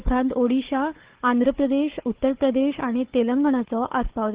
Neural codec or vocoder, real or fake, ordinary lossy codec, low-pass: codec, 16 kHz, 4 kbps, FunCodec, trained on Chinese and English, 50 frames a second; fake; Opus, 16 kbps; 3.6 kHz